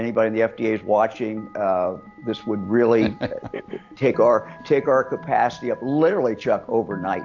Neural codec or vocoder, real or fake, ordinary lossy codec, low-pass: none; real; AAC, 48 kbps; 7.2 kHz